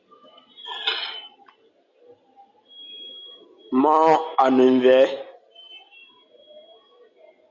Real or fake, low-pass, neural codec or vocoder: real; 7.2 kHz; none